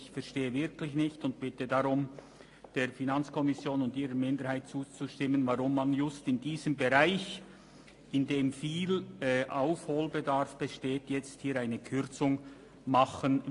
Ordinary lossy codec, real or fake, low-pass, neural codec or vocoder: AAC, 48 kbps; real; 10.8 kHz; none